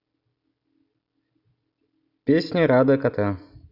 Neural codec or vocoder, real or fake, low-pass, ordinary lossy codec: none; real; 5.4 kHz; none